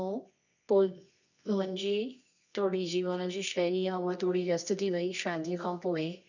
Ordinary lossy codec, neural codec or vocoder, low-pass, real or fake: none; codec, 24 kHz, 0.9 kbps, WavTokenizer, medium music audio release; 7.2 kHz; fake